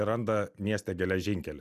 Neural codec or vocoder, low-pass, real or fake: none; 14.4 kHz; real